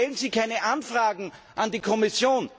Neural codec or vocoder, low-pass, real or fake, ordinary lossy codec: none; none; real; none